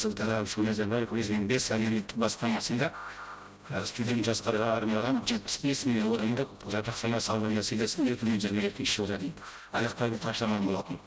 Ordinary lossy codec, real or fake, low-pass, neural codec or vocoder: none; fake; none; codec, 16 kHz, 0.5 kbps, FreqCodec, smaller model